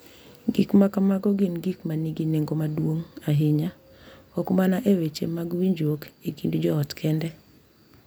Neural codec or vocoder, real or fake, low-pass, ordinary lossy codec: none; real; none; none